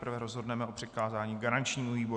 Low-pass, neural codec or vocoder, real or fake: 9.9 kHz; none; real